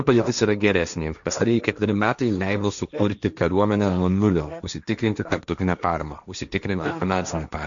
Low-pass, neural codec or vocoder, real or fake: 7.2 kHz; codec, 16 kHz, 1.1 kbps, Voila-Tokenizer; fake